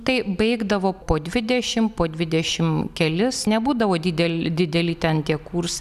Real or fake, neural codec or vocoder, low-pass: real; none; 14.4 kHz